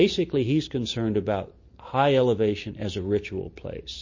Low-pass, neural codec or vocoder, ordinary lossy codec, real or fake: 7.2 kHz; none; MP3, 32 kbps; real